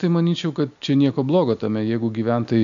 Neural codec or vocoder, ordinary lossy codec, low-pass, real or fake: none; AAC, 96 kbps; 7.2 kHz; real